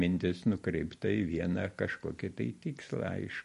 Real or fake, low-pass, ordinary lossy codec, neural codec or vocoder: real; 14.4 kHz; MP3, 48 kbps; none